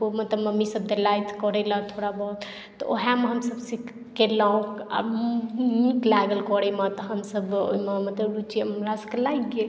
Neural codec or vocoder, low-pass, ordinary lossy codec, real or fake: none; none; none; real